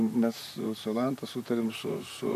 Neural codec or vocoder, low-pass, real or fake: vocoder, 44.1 kHz, 128 mel bands, Pupu-Vocoder; 14.4 kHz; fake